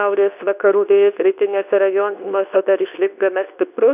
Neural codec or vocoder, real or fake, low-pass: codec, 24 kHz, 0.9 kbps, WavTokenizer, medium speech release version 2; fake; 3.6 kHz